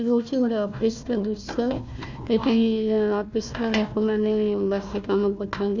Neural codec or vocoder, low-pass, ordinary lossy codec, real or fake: codec, 16 kHz, 1 kbps, FunCodec, trained on Chinese and English, 50 frames a second; 7.2 kHz; none; fake